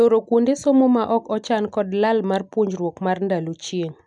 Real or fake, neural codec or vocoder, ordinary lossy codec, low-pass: real; none; none; 10.8 kHz